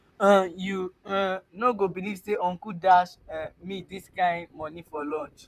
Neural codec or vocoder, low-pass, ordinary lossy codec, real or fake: vocoder, 44.1 kHz, 128 mel bands, Pupu-Vocoder; 14.4 kHz; none; fake